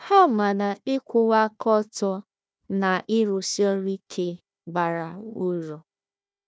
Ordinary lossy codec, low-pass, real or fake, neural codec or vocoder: none; none; fake; codec, 16 kHz, 1 kbps, FunCodec, trained on Chinese and English, 50 frames a second